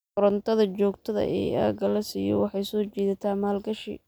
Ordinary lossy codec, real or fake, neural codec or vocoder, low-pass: none; real; none; none